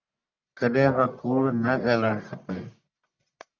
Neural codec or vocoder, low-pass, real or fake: codec, 44.1 kHz, 1.7 kbps, Pupu-Codec; 7.2 kHz; fake